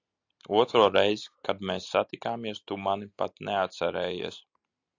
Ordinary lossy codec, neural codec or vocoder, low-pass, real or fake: AAC, 48 kbps; none; 7.2 kHz; real